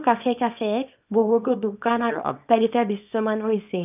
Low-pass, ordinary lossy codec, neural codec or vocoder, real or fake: 3.6 kHz; none; codec, 24 kHz, 0.9 kbps, WavTokenizer, small release; fake